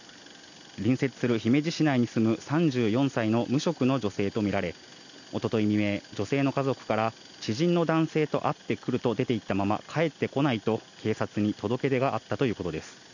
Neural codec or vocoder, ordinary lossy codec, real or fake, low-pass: none; none; real; 7.2 kHz